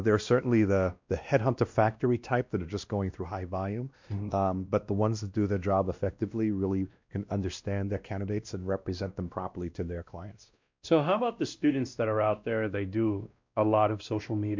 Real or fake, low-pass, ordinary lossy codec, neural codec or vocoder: fake; 7.2 kHz; MP3, 64 kbps; codec, 16 kHz, 1 kbps, X-Codec, WavLM features, trained on Multilingual LibriSpeech